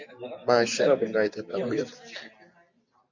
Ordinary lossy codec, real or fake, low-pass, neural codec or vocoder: MP3, 64 kbps; real; 7.2 kHz; none